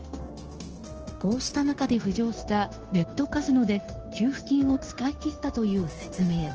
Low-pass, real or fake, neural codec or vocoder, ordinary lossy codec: 7.2 kHz; fake; codec, 16 kHz, 0.9 kbps, LongCat-Audio-Codec; Opus, 16 kbps